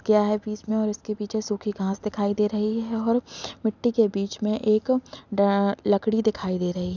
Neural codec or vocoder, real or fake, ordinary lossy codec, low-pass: none; real; none; 7.2 kHz